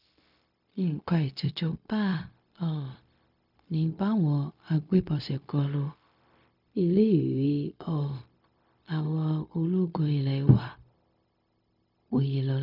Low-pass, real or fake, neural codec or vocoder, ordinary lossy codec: 5.4 kHz; fake; codec, 16 kHz, 0.4 kbps, LongCat-Audio-Codec; none